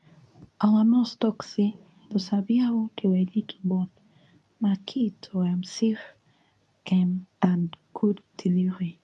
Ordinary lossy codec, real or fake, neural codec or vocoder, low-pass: none; fake; codec, 24 kHz, 0.9 kbps, WavTokenizer, medium speech release version 2; none